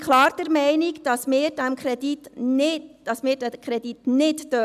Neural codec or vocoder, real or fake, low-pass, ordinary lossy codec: none; real; 14.4 kHz; none